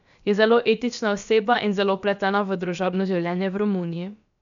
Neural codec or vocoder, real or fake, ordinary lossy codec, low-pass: codec, 16 kHz, about 1 kbps, DyCAST, with the encoder's durations; fake; none; 7.2 kHz